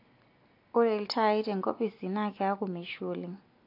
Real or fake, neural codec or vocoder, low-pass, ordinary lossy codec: real; none; 5.4 kHz; AAC, 32 kbps